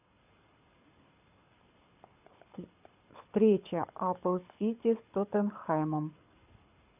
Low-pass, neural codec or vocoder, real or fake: 3.6 kHz; codec, 24 kHz, 6 kbps, HILCodec; fake